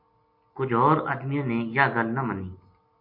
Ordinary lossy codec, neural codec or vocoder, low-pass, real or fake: MP3, 32 kbps; none; 5.4 kHz; real